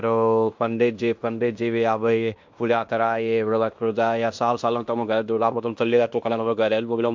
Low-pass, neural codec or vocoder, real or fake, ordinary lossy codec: 7.2 kHz; codec, 16 kHz in and 24 kHz out, 0.9 kbps, LongCat-Audio-Codec, fine tuned four codebook decoder; fake; MP3, 64 kbps